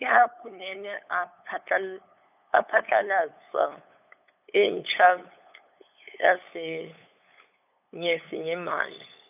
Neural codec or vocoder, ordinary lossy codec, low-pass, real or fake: codec, 16 kHz, 8 kbps, FunCodec, trained on LibriTTS, 25 frames a second; none; 3.6 kHz; fake